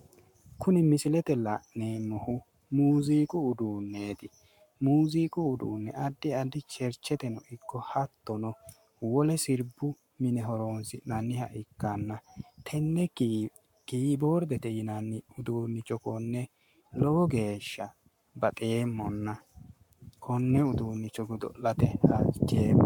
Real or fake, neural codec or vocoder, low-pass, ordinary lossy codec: fake; codec, 44.1 kHz, 7.8 kbps, Pupu-Codec; 19.8 kHz; MP3, 96 kbps